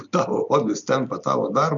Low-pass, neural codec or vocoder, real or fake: 7.2 kHz; none; real